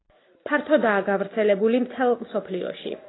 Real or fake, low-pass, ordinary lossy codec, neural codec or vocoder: real; 7.2 kHz; AAC, 16 kbps; none